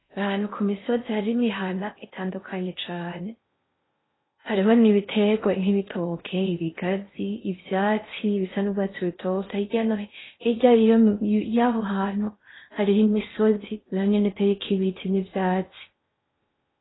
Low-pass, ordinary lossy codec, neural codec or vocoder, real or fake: 7.2 kHz; AAC, 16 kbps; codec, 16 kHz in and 24 kHz out, 0.6 kbps, FocalCodec, streaming, 4096 codes; fake